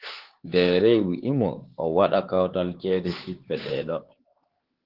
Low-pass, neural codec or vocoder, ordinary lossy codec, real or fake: 5.4 kHz; codec, 16 kHz, 4 kbps, X-Codec, HuBERT features, trained on LibriSpeech; Opus, 16 kbps; fake